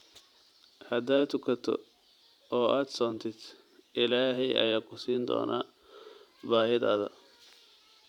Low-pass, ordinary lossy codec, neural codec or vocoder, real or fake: 19.8 kHz; none; vocoder, 44.1 kHz, 128 mel bands every 512 samples, BigVGAN v2; fake